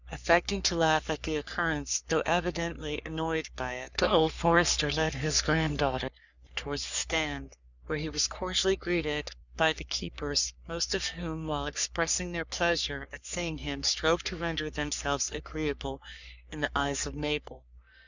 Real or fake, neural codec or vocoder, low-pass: fake; codec, 44.1 kHz, 3.4 kbps, Pupu-Codec; 7.2 kHz